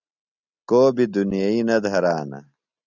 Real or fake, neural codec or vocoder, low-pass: real; none; 7.2 kHz